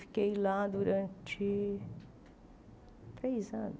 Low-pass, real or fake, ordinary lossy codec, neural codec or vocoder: none; real; none; none